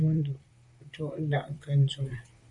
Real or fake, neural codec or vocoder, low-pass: fake; vocoder, 44.1 kHz, 128 mel bands, Pupu-Vocoder; 10.8 kHz